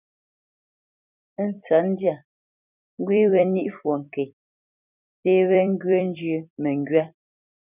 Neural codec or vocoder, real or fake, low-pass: vocoder, 44.1 kHz, 128 mel bands every 256 samples, BigVGAN v2; fake; 3.6 kHz